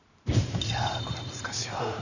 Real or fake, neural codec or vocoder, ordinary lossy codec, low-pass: fake; vocoder, 44.1 kHz, 128 mel bands every 512 samples, BigVGAN v2; none; 7.2 kHz